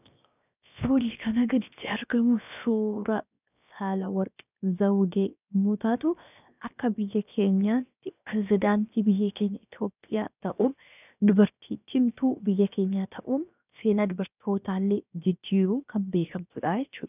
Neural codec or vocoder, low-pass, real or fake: codec, 16 kHz, 0.7 kbps, FocalCodec; 3.6 kHz; fake